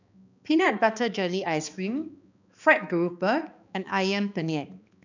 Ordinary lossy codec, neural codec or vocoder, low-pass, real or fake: none; codec, 16 kHz, 2 kbps, X-Codec, HuBERT features, trained on balanced general audio; 7.2 kHz; fake